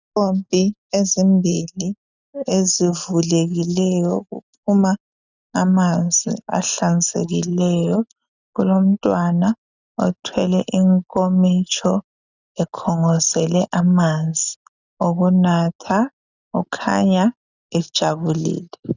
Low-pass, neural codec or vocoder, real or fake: 7.2 kHz; none; real